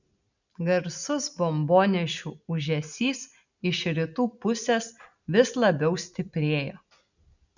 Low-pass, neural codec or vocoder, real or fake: 7.2 kHz; none; real